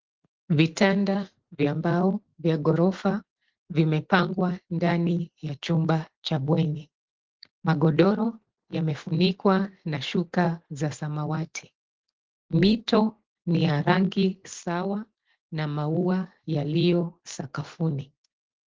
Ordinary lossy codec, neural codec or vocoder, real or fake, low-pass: Opus, 16 kbps; none; real; 7.2 kHz